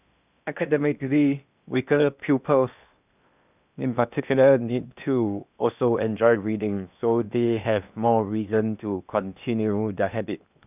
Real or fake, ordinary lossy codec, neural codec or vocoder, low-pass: fake; none; codec, 16 kHz in and 24 kHz out, 0.8 kbps, FocalCodec, streaming, 65536 codes; 3.6 kHz